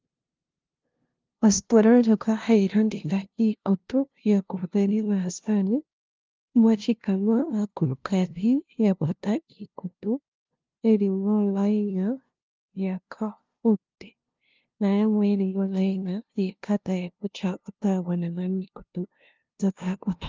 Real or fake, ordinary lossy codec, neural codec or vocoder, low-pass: fake; Opus, 32 kbps; codec, 16 kHz, 0.5 kbps, FunCodec, trained on LibriTTS, 25 frames a second; 7.2 kHz